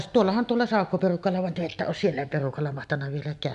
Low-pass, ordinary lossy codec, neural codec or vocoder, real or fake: 10.8 kHz; none; none; real